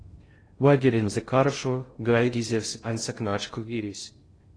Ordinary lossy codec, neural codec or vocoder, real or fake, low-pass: AAC, 32 kbps; codec, 16 kHz in and 24 kHz out, 0.6 kbps, FocalCodec, streaming, 2048 codes; fake; 9.9 kHz